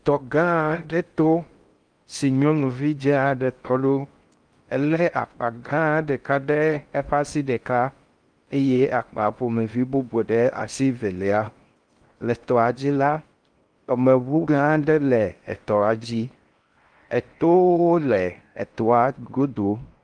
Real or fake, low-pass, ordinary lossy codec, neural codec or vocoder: fake; 9.9 kHz; Opus, 32 kbps; codec, 16 kHz in and 24 kHz out, 0.6 kbps, FocalCodec, streaming, 2048 codes